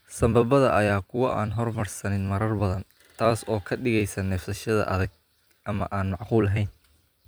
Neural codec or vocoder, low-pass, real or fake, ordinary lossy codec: vocoder, 44.1 kHz, 128 mel bands every 256 samples, BigVGAN v2; none; fake; none